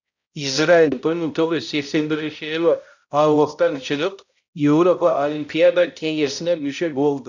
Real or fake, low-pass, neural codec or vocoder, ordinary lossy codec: fake; 7.2 kHz; codec, 16 kHz, 0.5 kbps, X-Codec, HuBERT features, trained on balanced general audio; none